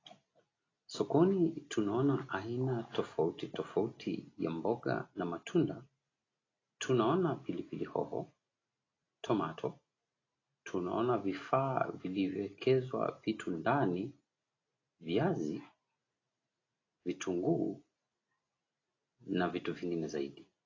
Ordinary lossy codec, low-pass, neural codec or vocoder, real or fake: AAC, 32 kbps; 7.2 kHz; none; real